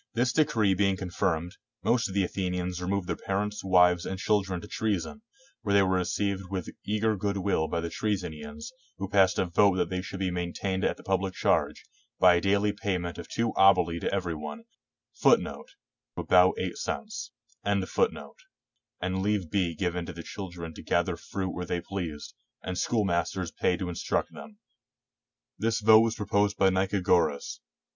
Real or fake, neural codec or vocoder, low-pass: real; none; 7.2 kHz